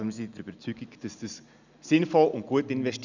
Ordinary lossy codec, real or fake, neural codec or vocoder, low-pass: none; real; none; 7.2 kHz